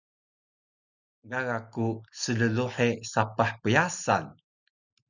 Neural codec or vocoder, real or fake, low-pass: none; real; 7.2 kHz